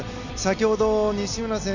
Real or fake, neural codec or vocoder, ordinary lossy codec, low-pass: real; none; none; 7.2 kHz